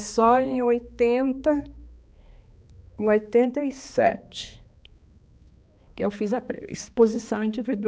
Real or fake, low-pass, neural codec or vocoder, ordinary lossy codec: fake; none; codec, 16 kHz, 2 kbps, X-Codec, HuBERT features, trained on balanced general audio; none